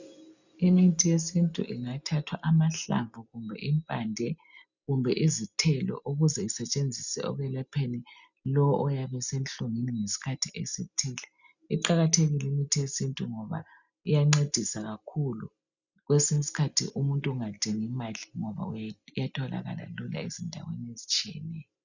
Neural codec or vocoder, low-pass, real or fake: none; 7.2 kHz; real